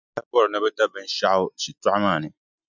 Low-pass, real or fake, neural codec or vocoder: 7.2 kHz; real; none